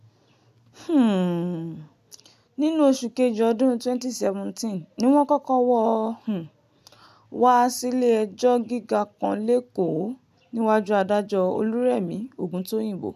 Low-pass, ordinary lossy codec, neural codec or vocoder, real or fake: 14.4 kHz; none; none; real